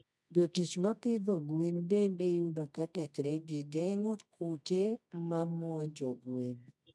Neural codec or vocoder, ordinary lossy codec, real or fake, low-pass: codec, 24 kHz, 0.9 kbps, WavTokenizer, medium music audio release; none; fake; none